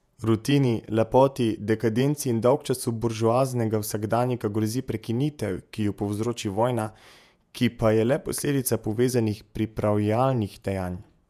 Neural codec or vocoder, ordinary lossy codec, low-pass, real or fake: vocoder, 48 kHz, 128 mel bands, Vocos; none; 14.4 kHz; fake